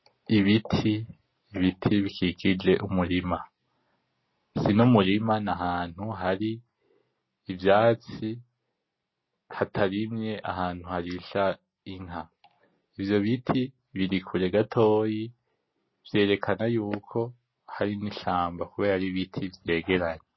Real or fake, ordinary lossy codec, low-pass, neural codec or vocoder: real; MP3, 24 kbps; 7.2 kHz; none